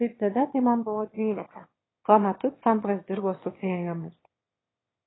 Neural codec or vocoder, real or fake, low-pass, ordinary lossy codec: autoencoder, 22.05 kHz, a latent of 192 numbers a frame, VITS, trained on one speaker; fake; 7.2 kHz; AAC, 16 kbps